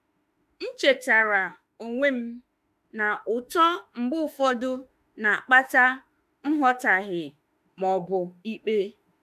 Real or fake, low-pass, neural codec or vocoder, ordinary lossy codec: fake; 14.4 kHz; autoencoder, 48 kHz, 32 numbers a frame, DAC-VAE, trained on Japanese speech; AAC, 96 kbps